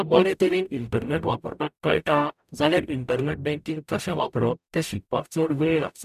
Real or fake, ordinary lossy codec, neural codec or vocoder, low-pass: fake; none; codec, 44.1 kHz, 0.9 kbps, DAC; 14.4 kHz